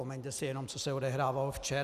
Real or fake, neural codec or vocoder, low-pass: real; none; 14.4 kHz